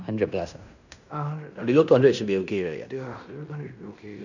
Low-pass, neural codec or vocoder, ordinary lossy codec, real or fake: 7.2 kHz; codec, 16 kHz in and 24 kHz out, 0.9 kbps, LongCat-Audio-Codec, fine tuned four codebook decoder; none; fake